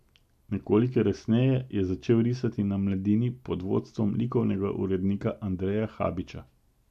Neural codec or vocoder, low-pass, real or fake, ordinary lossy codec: none; 14.4 kHz; real; MP3, 96 kbps